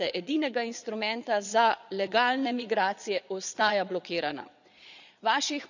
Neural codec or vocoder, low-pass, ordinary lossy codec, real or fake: vocoder, 22.05 kHz, 80 mel bands, Vocos; 7.2 kHz; none; fake